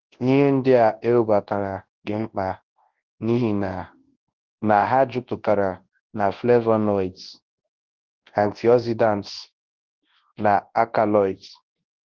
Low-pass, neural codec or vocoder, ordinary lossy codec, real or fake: 7.2 kHz; codec, 24 kHz, 0.9 kbps, WavTokenizer, large speech release; Opus, 16 kbps; fake